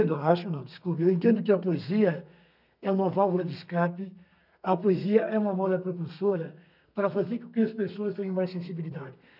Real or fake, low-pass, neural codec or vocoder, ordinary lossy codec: fake; 5.4 kHz; codec, 32 kHz, 1.9 kbps, SNAC; none